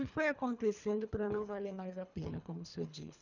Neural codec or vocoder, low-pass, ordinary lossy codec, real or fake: codec, 24 kHz, 3 kbps, HILCodec; 7.2 kHz; none; fake